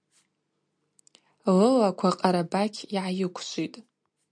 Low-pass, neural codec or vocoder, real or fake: 9.9 kHz; none; real